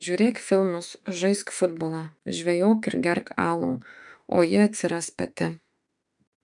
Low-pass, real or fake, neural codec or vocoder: 10.8 kHz; fake; autoencoder, 48 kHz, 32 numbers a frame, DAC-VAE, trained on Japanese speech